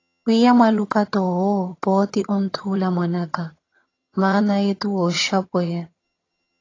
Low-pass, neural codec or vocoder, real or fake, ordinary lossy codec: 7.2 kHz; vocoder, 22.05 kHz, 80 mel bands, HiFi-GAN; fake; AAC, 32 kbps